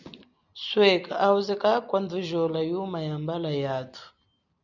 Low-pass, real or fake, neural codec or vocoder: 7.2 kHz; real; none